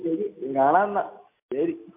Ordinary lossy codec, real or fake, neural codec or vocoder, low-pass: MP3, 32 kbps; real; none; 3.6 kHz